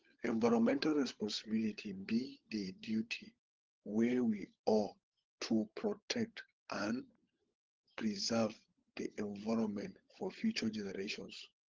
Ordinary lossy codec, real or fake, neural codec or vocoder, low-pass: Opus, 16 kbps; fake; codec, 16 kHz, 16 kbps, FunCodec, trained on LibriTTS, 50 frames a second; 7.2 kHz